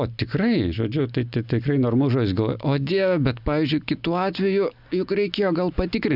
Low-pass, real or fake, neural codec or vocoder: 5.4 kHz; real; none